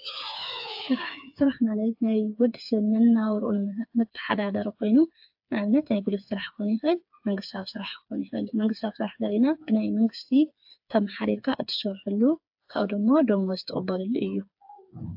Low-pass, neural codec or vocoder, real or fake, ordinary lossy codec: 5.4 kHz; codec, 16 kHz, 4 kbps, FreqCodec, smaller model; fake; AAC, 48 kbps